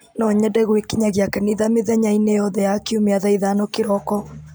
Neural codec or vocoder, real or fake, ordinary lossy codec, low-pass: none; real; none; none